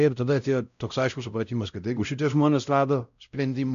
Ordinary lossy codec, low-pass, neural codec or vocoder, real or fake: MP3, 96 kbps; 7.2 kHz; codec, 16 kHz, 0.5 kbps, X-Codec, WavLM features, trained on Multilingual LibriSpeech; fake